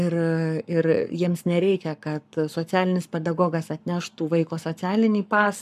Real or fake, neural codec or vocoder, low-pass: fake; codec, 44.1 kHz, 7.8 kbps, Pupu-Codec; 14.4 kHz